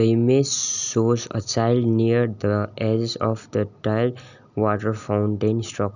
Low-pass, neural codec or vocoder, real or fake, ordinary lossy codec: 7.2 kHz; none; real; none